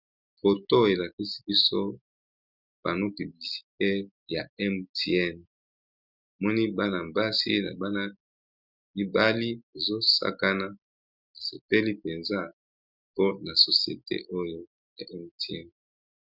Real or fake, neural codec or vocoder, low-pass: real; none; 5.4 kHz